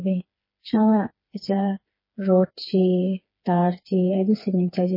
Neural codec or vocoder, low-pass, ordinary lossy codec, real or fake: codec, 16 kHz, 4 kbps, FreqCodec, smaller model; 5.4 kHz; MP3, 24 kbps; fake